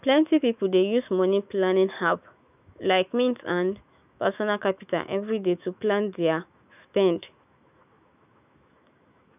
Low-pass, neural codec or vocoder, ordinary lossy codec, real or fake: 3.6 kHz; autoencoder, 48 kHz, 128 numbers a frame, DAC-VAE, trained on Japanese speech; none; fake